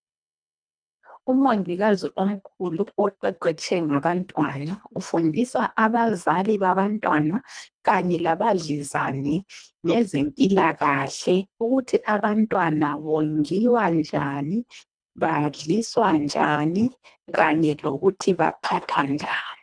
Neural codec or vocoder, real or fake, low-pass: codec, 24 kHz, 1.5 kbps, HILCodec; fake; 9.9 kHz